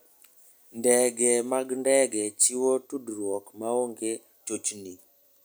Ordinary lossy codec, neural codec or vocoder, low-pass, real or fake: none; none; none; real